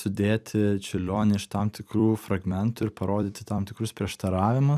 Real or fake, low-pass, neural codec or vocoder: fake; 14.4 kHz; vocoder, 44.1 kHz, 128 mel bands every 256 samples, BigVGAN v2